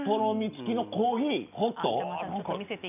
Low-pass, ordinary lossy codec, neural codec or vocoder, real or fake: 3.6 kHz; none; none; real